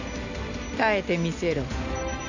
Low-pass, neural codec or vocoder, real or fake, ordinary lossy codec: 7.2 kHz; none; real; none